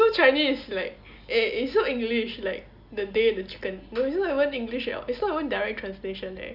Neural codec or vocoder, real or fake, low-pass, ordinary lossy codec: none; real; 5.4 kHz; none